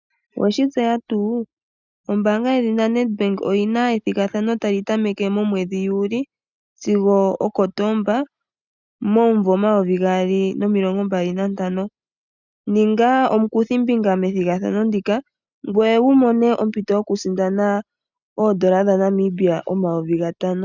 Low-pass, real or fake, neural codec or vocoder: 7.2 kHz; real; none